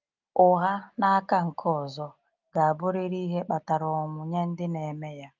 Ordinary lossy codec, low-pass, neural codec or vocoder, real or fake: Opus, 24 kbps; 7.2 kHz; none; real